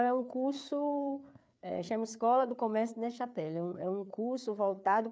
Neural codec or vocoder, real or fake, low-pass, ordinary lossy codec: codec, 16 kHz, 4 kbps, FreqCodec, larger model; fake; none; none